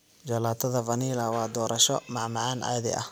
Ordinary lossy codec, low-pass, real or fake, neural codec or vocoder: none; none; real; none